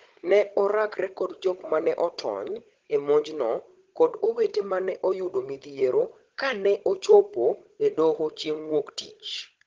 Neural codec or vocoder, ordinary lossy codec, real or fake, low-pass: codec, 16 kHz, 16 kbps, FunCodec, trained on Chinese and English, 50 frames a second; Opus, 16 kbps; fake; 7.2 kHz